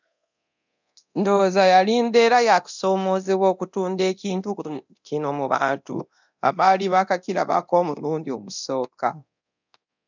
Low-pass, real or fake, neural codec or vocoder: 7.2 kHz; fake; codec, 24 kHz, 0.9 kbps, DualCodec